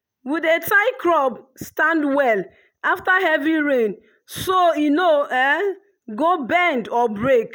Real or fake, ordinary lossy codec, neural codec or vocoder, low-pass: real; none; none; none